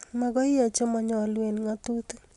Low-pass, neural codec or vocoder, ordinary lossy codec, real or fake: 10.8 kHz; none; none; real